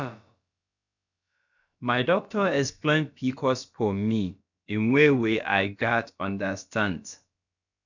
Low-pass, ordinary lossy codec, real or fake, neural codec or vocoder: 7.2 kHz; none; fake; codec, 16 kHz, about 1 kbps, DyCAST, with the encoder's durations